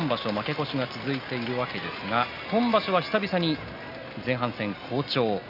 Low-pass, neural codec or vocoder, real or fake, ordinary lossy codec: 5.4 kHz; none; real; none